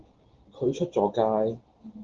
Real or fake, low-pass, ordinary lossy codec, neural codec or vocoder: real; 7.2 kHz; Opus, 16 kbps; none